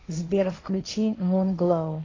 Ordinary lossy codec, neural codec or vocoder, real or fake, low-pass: AAC, 32 kbps; codec, 16 kHz, 1.1 kbps, Voila-Tokenizer; fake; 7.2 kHz